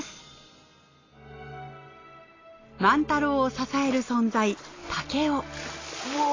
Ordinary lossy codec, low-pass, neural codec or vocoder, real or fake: AAC, 32 kbps; 7.2 kHz; none; real